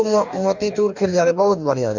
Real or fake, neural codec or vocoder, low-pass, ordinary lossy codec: fake; codec, 44.1 kHz, 2.6 kbps, DAC; 7.2 kHz; none